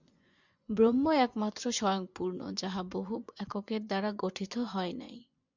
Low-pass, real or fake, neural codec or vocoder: 7.2 kHz; fake; vocoder, 24 kHz, 100 mel bands, Vocos